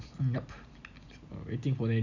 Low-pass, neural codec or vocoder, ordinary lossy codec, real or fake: 7.2 kHz; none; none; real